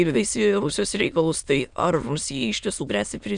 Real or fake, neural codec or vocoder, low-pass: fake; autoencoder, 22.05 kHz, a latent of 192 numbers a frame, VITS, trained on many speakers; 9.9 kHz